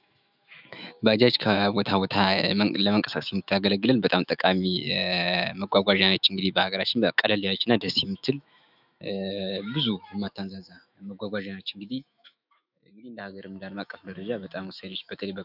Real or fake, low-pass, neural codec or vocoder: fake; 5.4 kHz; autoencoder, 48 kHz, 128 numbers a frame, DAC-VAE, trained on Japanese speech